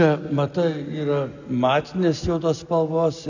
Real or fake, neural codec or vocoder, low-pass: real; none; 7.2 kHz